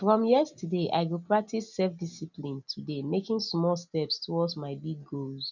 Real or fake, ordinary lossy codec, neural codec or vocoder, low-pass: real; none; none; 7.2 kHz